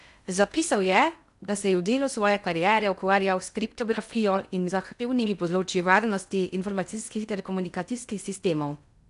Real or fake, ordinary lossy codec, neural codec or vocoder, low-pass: fake; none; codec, 16 kHz in and 24 kHz out, 0.6 kbps, FocalCodec, streaming, 2048 codes; 10.8 kHz